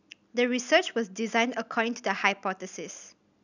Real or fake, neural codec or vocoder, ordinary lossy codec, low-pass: real; none; none; 7.2 kHz